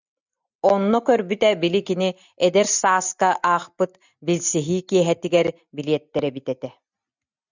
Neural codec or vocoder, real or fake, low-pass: none; real; 7.2 kHz